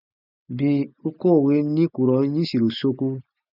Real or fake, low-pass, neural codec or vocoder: real; 5.4 kHz; none